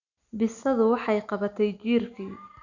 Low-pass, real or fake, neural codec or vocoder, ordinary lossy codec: 7.2 kHz; real; none; none